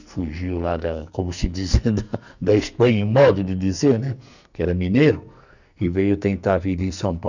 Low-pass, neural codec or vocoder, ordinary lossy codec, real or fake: 7.2 kHz; codec, 44.1 kHz, 2.6 kbps, SNAC; none; fake